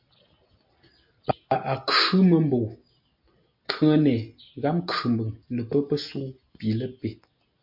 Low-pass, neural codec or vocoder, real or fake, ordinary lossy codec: 5.4 kHz; none; real; MP3, 48 kbps